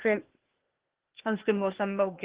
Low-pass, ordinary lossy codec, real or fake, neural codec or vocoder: 3.6 kHz; Opus, 16 kbps; fake; codec, 16 kHz, 0.8 kbps, ZipCodec